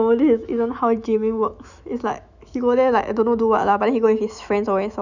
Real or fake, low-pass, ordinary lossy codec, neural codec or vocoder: fake; 7.2 kHz; none; autoencoder, 48 kHz, 128 numbers a frame, DAC-VAE, trained on Japanese speech